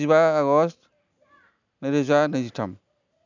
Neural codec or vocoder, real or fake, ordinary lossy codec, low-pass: none; real; none; 7.2 kHz